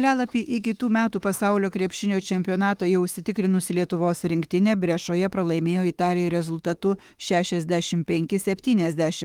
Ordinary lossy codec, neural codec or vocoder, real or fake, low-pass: Opus, 24 kbps; autoencoder, 48 kHz, 32 numbers a frame, DAC-VAE, trained on Japanese speech; fake; 19.8 kHz